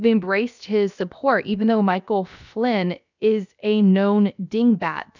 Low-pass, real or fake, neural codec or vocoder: 7.2 kHz; fake; codec, 16 kHz, about 1 kbps, DyCAST, with the encoder's durations